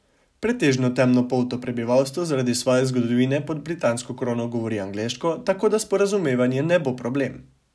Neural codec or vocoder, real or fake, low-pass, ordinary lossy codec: none; real; none; none